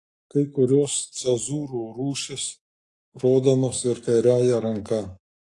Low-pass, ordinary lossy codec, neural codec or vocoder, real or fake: 10.8 kHz; AAC, 48 kbps; codec, 44.1 kHz, 7.8 kbps, Pupu-Codec; fake